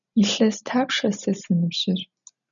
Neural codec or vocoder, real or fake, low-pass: none; real; 7.2 kHz